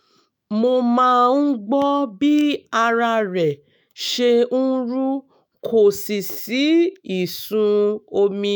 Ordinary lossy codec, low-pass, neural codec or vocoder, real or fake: none; none; autoencoder, 48 kHz, 128 numbers a frame, DAC-VAE, trained on Japanese speech; fake